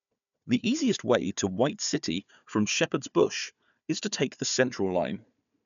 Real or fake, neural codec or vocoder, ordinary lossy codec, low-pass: fake; codec, 16 kHz, 4 kbps, FunCodec, trained on Chinese and English, 50 frames a second; none; 7.2 kHz